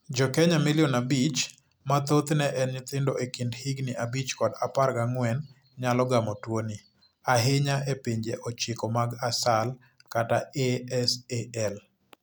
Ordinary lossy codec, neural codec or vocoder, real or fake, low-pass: none; none; real; none